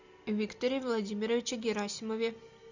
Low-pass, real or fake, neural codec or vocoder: 7.2 kHz; real; none